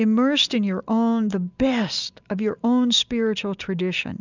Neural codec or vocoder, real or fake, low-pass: none; real; 7.2 kHz